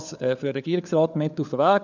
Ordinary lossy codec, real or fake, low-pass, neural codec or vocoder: none; fake; 7.2 kHz; codec, 16 kHz, 4 kbps, FunCodec, trained on LibriTTS, 50 frames a second